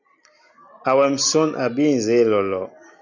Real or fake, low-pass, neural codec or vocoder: real; 7.2 kHz; none